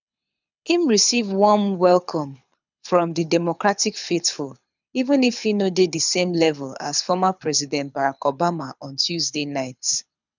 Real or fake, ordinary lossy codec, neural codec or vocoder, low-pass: fake; none; codec, 24 kHz, 6 kbps, HILCodec; 7.2 kHz